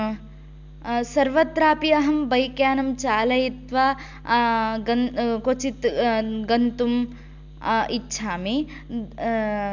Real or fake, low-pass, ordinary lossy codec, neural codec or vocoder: real; 7.2 kHz; none; none